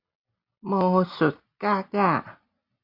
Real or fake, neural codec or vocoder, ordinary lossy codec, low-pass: real; none; Opus, 64 kbps; 5.4 kHz